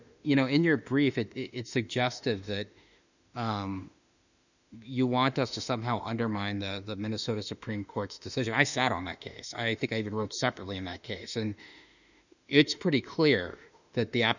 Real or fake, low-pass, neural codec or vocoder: fake; 7.2 kHz; autoencoder, 48 kHz, 32 numbers a frame, DAC-VAE, trained on Japanese speech